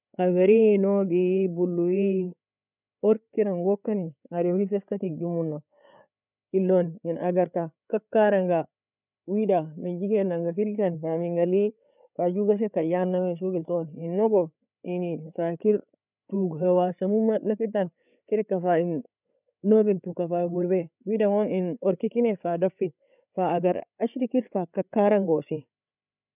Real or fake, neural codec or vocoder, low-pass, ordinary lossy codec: fake; codec, 16 kHz, 8 kbps, FreqCodec, larger model; 3.6 kHz; none